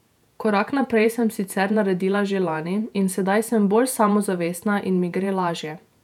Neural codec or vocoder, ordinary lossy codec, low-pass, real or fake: vocoder, 48 kHz, 128 mel bands, Vocos; none; 19.8 kHz; fake